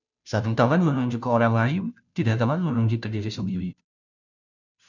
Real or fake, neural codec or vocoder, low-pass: fake; codec, 16 kHz, 0.5 kbps, FunCodec, trained on Chinese and English, 25 frames a second; 7.2 kHz